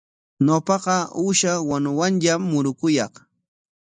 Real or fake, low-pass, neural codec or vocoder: real; 9.9 kHz; none